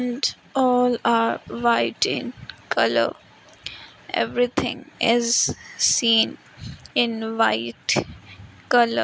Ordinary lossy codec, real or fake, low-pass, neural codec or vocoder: none; real; none; none